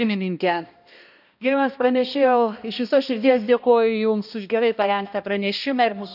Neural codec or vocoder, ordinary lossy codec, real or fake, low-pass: codec, 16 kHz, 1 kbps, X-Codec, HuBERT features, trained on balanced general audio; none; fake; 5.4 kHz